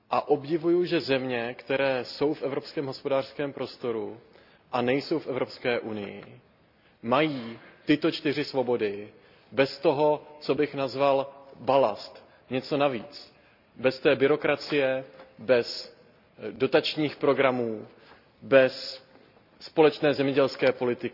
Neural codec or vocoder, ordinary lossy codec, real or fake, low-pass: none; none; real; 5.4 kHz